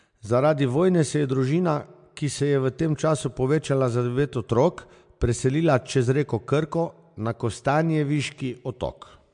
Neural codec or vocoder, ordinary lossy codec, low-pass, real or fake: none; MP3, 64 kbps; 9.9 kHz; real